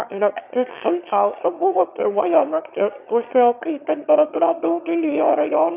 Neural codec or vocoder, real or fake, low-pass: autoencoder, 22.05 kHz, a latent of 192 numbers a frame, VITS, trained on one speaker; fake; 3.6 kHz